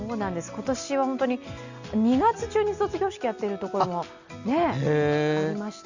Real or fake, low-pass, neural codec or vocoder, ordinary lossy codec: real; 7.2 kHz; none; Opus, 64 kbps